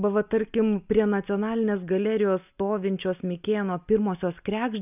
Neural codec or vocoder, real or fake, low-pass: none; real; 3.6 kHz